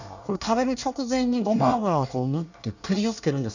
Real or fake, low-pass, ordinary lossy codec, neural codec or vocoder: fake; 7.2 kHz; none; codec, 24 kHz, 1 kbps, SNAC